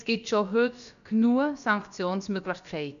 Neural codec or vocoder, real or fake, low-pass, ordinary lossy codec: codec, 16 kHz, about 1 kbps, DyCAST, with the encoder's durations; fake; 7.2 kHz; none